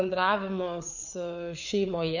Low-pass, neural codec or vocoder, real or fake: 7.2 kHz; codec, 16 kHz, 4 kbps, FreqCodec, larger model; fake